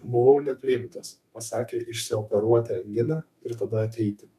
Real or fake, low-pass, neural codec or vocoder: fake; 14.4 kHz; codec, 32 kHz, 1.9 kbps, SNAC